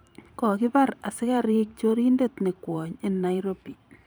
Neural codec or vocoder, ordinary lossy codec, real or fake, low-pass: vocoder, 44.1 kHz, 128 mel bands every 512 samples, BigVGAN v2; none; fake; none